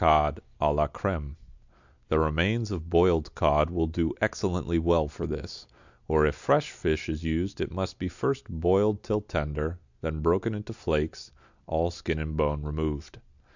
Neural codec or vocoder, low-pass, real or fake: none; 7.2 kHz; real